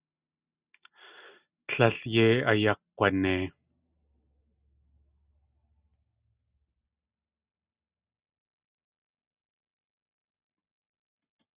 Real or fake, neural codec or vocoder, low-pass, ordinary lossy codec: real; none; 3.6 kHz; Opus, 64 kbps